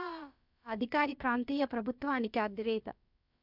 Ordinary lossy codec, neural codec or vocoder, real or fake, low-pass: none; codec, 16 kHz, about 1 kbps, DyCAST, with the encoder's durations; fake; 5.4 kHz